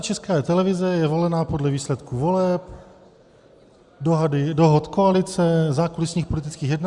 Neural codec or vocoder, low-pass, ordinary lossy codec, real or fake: none; 10.8 kHz; Opus, 64 kbps; real